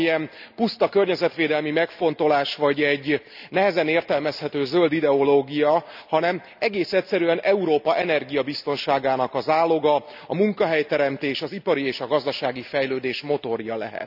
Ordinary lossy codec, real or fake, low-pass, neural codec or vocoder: none; real; 5.4 kHz; none